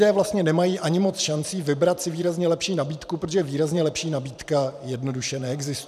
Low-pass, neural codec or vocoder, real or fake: 14.4 kHz; vocoder, 44.1 kHz, 128 mel bands every 512 samples, BigVGAN v2; fake